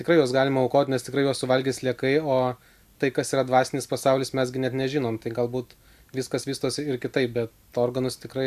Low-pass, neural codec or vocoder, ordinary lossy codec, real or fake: 14.4 kHz; none; AAC, 96 kbps; real